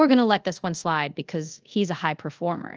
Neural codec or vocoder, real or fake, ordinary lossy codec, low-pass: codec, 24 kHz, 0.5 kbps, DualCodec; fake; Opus, 24 kbps; 7.2 kHz